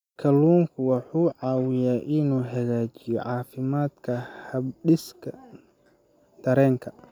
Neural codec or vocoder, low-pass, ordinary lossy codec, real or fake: none; 19.8 kHz; none; real